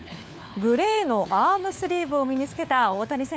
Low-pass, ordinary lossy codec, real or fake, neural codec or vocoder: none; none; fake; codec, 16 kHz, 4 kbps, FunCodec, trained on LibriTTS, 50 frames a second